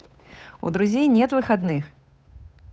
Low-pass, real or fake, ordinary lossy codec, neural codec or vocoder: none; fake; none; codec, 16 kHz, 8 kbps, FunCodec, trained on Chinese and English, 25 frames a second